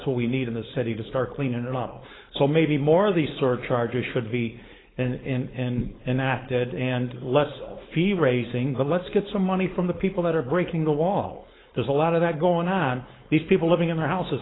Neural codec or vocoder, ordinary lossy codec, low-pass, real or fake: codec, 16 kHz, 4.8 kbps, FACodec; AAC, 16 kbps; 7.2 kHz; fake